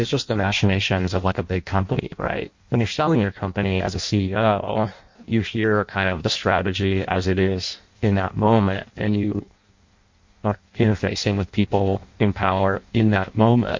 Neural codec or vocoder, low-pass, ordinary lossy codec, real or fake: codec, 16 kHz in and 24 kHz out, 0.6 kbps, FireRedTTS-2 codec; 7.2 kHz; MP3, 48 kbps; fake